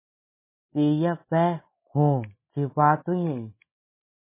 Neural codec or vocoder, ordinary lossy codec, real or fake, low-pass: none; MP3, 16 kbps; real; 3.6 kHz